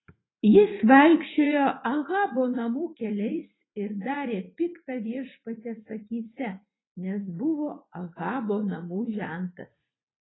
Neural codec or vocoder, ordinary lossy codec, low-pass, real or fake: vocoder, 44.1 kHz, 80 mel bands, Vocos; AAC, 16 kbps; 7.2 kHz; fake